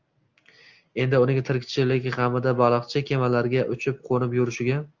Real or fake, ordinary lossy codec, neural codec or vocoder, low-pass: real; Opus, 32 kbps; none; 7.2 kHz